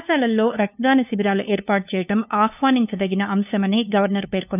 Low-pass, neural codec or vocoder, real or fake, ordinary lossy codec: 3.6 kHz; codec, 16 kHz, 2 kbps, FunCodec, trained on LibriTTS, 25 frames a second; fake; none